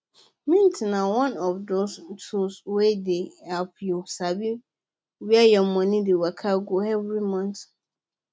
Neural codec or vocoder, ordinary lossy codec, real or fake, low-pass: none; none; real; none